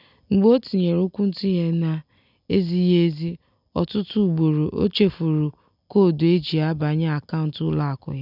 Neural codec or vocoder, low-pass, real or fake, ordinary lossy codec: none; 5.4 kHz; real; none